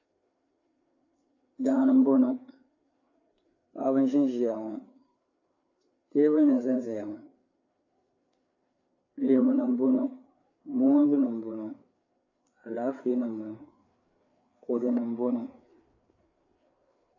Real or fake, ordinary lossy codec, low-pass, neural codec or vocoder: fake; AAC, 48 kbps; 7.2 kHz; codec, 16 kHz, 4 kbps, FreqCodec, larger model